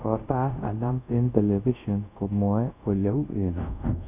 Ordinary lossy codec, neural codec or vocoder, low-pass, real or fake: none; codec, 24 kHz, 0.5 kbps, DualCodec; 3.6 kHz; fake